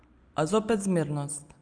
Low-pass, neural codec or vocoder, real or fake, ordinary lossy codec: 9.9 kHz; none; real; Opus, 32 kbps